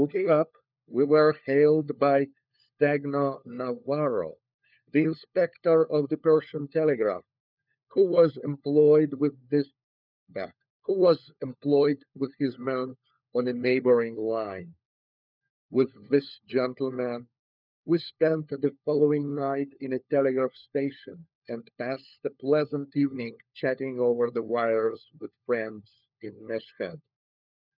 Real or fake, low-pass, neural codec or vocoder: fake; 5.4 kHz; codec, 16 kHz, 4 kbps, FunCodec, trained on LibriTTS, 50 frames a second